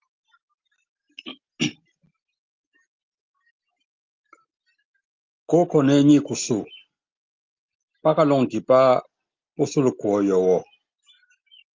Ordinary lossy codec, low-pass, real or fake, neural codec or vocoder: Opus, 24 kbps; 7.2 kHz; real; none